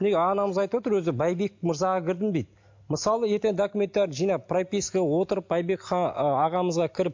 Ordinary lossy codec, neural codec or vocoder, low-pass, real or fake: MP3, 48 kbps; none; 7.2 kHz; real